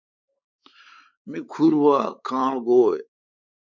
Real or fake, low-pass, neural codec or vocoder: fake; 7.2 kHz; codec, 16 kHz, 4 kbps, X-Codec, WavLM features, trained on Multilingual LibriSpeech